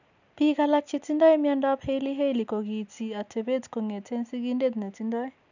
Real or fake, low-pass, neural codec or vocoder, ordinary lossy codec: real; 7.2 kHz; none; none